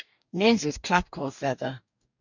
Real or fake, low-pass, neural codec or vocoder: fake; 7.2 kHz; codec, 44.1 kHz, 2.6 kbps, DAC